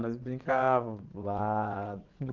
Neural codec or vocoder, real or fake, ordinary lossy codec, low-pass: vocoder, 22.05 kHz, 80 mel bands, WaveNeXt; fake; Opus, 24 kbps; 7.2 kHz